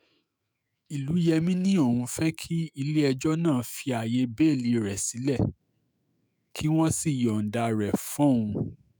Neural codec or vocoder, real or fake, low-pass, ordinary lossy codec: autoencoder, 48 kHz, 128 numbers a frame, DAC-VAE, trained on Japanese speech; fake; none; none